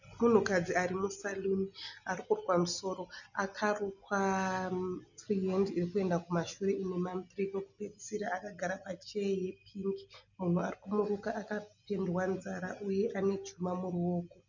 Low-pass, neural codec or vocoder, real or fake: 7.2 kHz; none; real